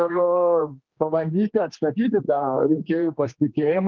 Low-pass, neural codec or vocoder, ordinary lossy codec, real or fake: 7.2 kHz; codec, 16 kHz, 2 kbps, X-Codec, HuBERT features, trained on general audio; Opus, 32 kbps; fake